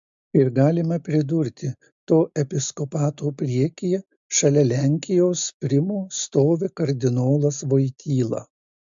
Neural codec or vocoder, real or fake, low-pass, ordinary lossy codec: none; real; 7.2 kHz; MP3, 64 kbps